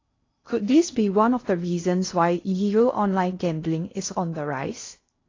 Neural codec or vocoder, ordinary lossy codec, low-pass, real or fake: codec, 16 kHz in and 24 kHz out, 0.6 kbps, FocalCodec, streaming, 2048 codes; AAC, 32 kbps; 7.2 kHz; fake